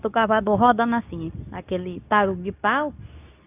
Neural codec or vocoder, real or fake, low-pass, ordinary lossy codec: codec, 24 kHz, 0.9 kbps, WavTokenizer, medium speech release version 2; fake; 3.6 kHz; AAC, 32 kbps